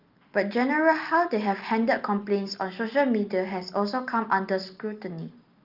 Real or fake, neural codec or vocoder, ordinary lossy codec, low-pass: real; none; Opus, 24 kbps; 5.4 kHz